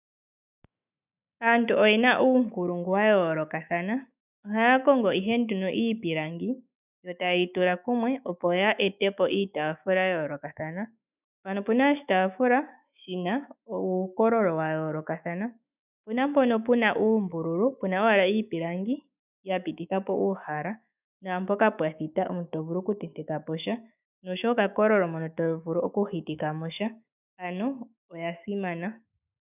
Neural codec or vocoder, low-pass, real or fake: autoencoder, 48 kHz, 128 numbers a frame, DAC-VAE, trained on Japanese speech; 3.6 kHz; fake